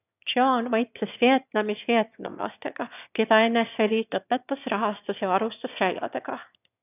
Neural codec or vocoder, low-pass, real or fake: autoencoder, 22.05 kHz, a latent of 192 numbers a frame, VITS, trained on one speaker; 3.6 kHz; fake